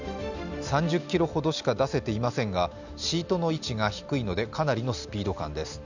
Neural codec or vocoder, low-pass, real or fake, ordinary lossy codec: none; 7.2 kHz; real; none